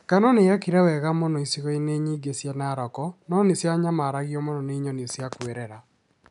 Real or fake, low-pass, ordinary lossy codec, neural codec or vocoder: real; 10.8 kHz; none; none